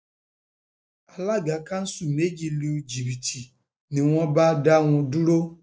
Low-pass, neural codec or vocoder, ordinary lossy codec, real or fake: none; none; none; real